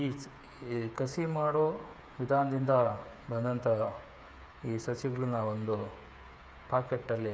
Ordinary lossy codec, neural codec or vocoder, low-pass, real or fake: none; codec, 16 kHz, 8 kbps, FreqCodec, smaller model; none; fake